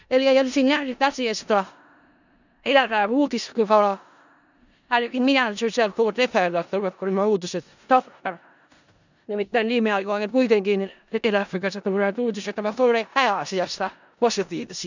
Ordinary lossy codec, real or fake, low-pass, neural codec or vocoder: none; fake; 7.2 kHz; codec, 16 kHz in and 24 kHz out, 0.4 kbps, LongCat-Audio-Codec, four codebook decoder